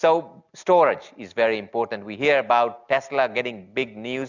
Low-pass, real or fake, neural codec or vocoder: 7.2 kHz; real; none